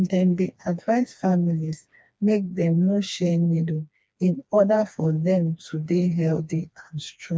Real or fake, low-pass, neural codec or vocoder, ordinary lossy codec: fake; none; codec, 16 kHz, 2 kbps, FreqCodec, smaller model; none